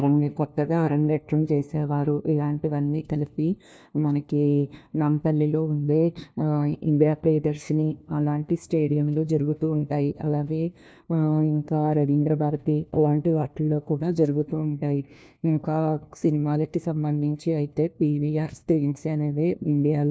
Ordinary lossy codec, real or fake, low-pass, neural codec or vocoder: none; fake; none; codec, 16 kHz, 1 kbps, FunCodec, trained on LibriTTS, 50 frames a second